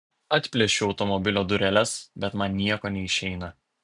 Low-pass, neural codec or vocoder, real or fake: 10.8 kHz; none; real